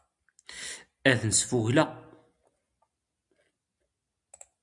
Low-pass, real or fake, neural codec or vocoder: 10.8 kHz; real; none